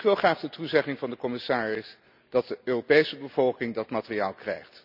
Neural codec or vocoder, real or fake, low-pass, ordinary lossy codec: none; real; 5.4 kHz; none